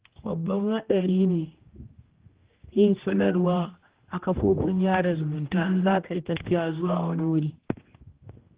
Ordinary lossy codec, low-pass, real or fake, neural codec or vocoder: Opus, 16 kbps; 3.6 kHz; fake; codec, 16 kHz, 1 kbps, X-Codec, HuBERT features, trained on general audio